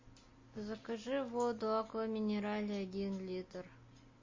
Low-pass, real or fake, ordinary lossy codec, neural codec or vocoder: 7.2 kHz; real; MP3, 32 kbps; none